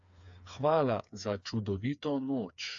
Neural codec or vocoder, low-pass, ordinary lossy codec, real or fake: codec, 16 kHz, 4 kbps, FreqCodec, smaller model; 7.2 kHz; AAC, 64 kbps; fake